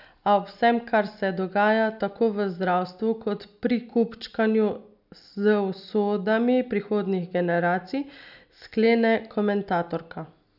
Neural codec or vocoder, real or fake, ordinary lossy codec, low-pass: none; real; none; 5.4 kHz